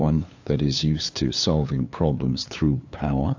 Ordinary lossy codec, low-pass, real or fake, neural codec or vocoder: AAC, 48 kbps; 7.2 kHz; fake; codec, 16 kHz, 2 kbps, FunCodec, trained on LibriTTS, 25 frames a second